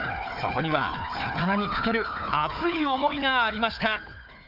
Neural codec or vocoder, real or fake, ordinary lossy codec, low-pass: codec, 16 kHz, 4 kbps, FunCodec, trained on Chinese and English, 50 frames a second; fake; none; 5.4 kHz